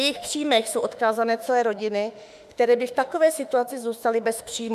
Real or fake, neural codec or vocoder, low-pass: fake; autoencoder, 48 kHz, 32 numbers a frame, DAC-VAE, trained on Japanese speech; 14.4 kHz